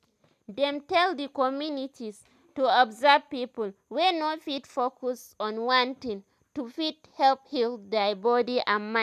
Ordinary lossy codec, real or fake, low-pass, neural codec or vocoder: none; real; 14.4 kHz; none